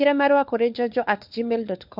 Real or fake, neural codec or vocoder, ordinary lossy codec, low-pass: fake; codec, 16 kHz, 4 kbps, FunCodec, trained on LibriTTS, 50 frames a second; MP3, 48 kbps; 5.4 kHz